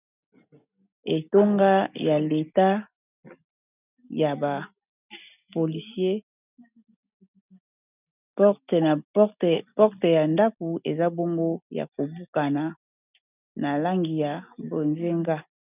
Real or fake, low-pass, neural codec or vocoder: real; 3.6 kHz; none